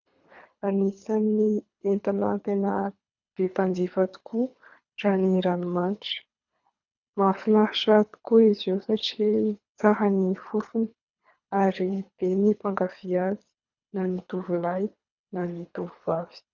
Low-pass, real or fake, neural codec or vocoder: 7.2 kHz; fake; codec, 24 kHz, 3 kbps, HILCodec